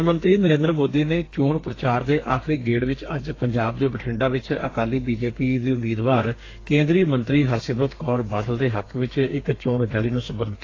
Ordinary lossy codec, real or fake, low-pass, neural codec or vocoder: AAC, 32 kbps; fake; 7.2 kHz; codec, 32 kHz, 1.9 kbps, SNAC